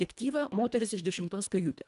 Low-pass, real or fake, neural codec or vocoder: 10.8 kHz; fake; codec, 24 kHz, 1.5 kbps, HILCodec